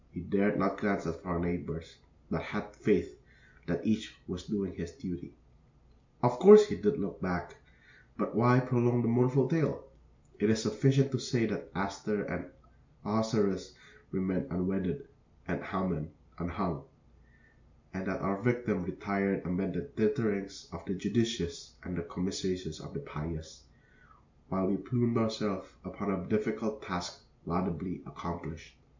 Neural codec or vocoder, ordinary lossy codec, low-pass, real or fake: none; MP3, 64 kbps; 7.2 kHz; real